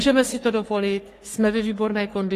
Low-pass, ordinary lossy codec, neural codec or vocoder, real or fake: 14.4 kHz; AAC, 48 kbps; codec, 44.1 kHz, 3.4 kbps, Pupu-Codec; fake